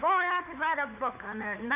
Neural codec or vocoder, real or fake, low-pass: codec, 16 kHz, 4 kbps, FunCodec, trained on LibriTTS, 50 frames a second; fake; 3.6 kHz